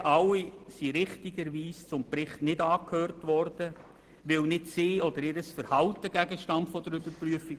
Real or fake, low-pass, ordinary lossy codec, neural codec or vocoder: real; 14.4 kHz; Opus, 16 kbps; none